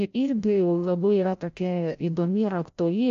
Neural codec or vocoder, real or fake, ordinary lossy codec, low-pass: codec, 16 kHz, 0.5 kbps, FreqCodec, larger model; fake; AAC, 64 kbps; 7.2 kHz